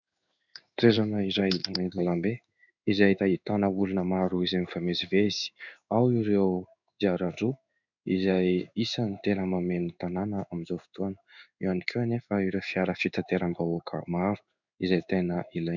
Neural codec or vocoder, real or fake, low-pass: codec, 16 kHz in and 24 kHz out, 1 kbps, XY-Tokenizer; fake; 7.2 kHz